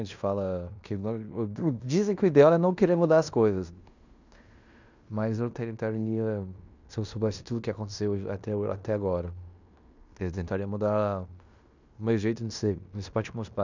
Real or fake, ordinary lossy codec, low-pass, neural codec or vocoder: fake; none; 7.2 kHz; codec, 16 kHz in and 24 kHz out, 0.9 kbps, LongCat-Audio-Codec, fine tuned four codebook decoder